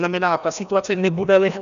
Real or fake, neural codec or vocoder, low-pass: fake; codec, 16 kHz, 1 kbps, FreqCodec, larger model; 7.2 kHz